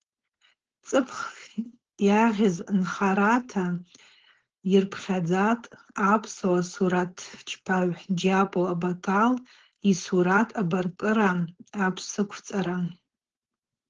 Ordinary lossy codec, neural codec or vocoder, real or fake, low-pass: Opus, 16 kbps; codec, 16 kHz, 4.8 kbps, FACodec; fake; 7.2 kHz